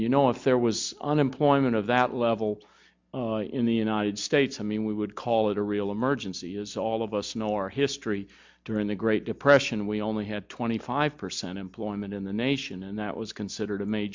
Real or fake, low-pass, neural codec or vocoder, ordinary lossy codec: real; 7.2 kHz; none; MP3, 64 kbps